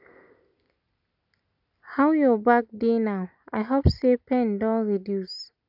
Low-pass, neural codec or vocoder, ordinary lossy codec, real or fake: 5.4 kHz; none; none; real